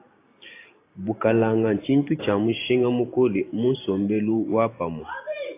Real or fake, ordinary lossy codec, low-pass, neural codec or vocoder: fake; AAC, 24 kbps; 3.6 kHz; vocoder, 44.1 kHz, 128 mel bands every 512 samples, BigVGAN v2